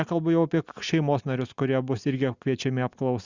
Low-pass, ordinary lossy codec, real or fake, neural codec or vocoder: 7.2 kHz; Opus, 64 kbps; real; none